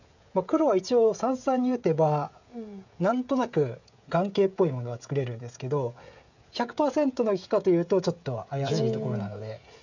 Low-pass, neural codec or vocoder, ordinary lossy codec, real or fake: 7.2 kHz; codec, 16 kHz, 16 kbps, FreqCodec, smaller model; none; fake